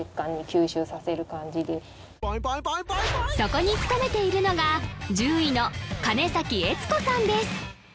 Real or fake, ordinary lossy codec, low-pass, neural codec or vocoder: real; none; none; none